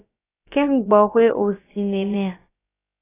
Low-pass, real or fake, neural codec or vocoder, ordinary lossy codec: 3.6 kHz; fake; codec, 16 kHz, about 1 kbps, DyCAST, with the encoder's durations; AAC, 16 kbps